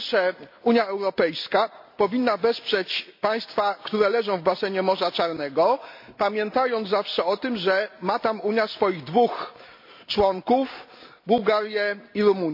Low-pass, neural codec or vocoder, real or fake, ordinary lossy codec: 5.4 kHz; none; real; MP3, 32 kbps